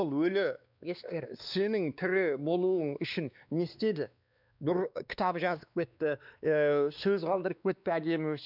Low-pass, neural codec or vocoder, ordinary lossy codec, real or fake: 5.4 kHz; codec, 16 kHz, 2 kbps, X-Codec, WavLM features, trained on Multilingual LibriSpeech; none; fake